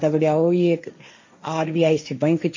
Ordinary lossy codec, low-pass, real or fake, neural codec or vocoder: MP3, 32 kbps; 7.2 kHz; fake; codec, 16 kHz, 1.1 kbps, Voila-Tokenizer